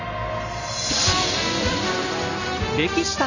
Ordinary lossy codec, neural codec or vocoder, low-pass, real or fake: none; none; 7.2 kHz; real